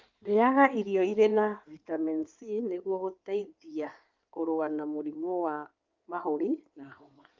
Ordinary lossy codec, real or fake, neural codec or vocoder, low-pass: Opus, 24 kbps; fake; codec, 16 kHz in and 24 kHz out, 2.2 kbps, FireRedTTS-2 codec; 7.2 kHz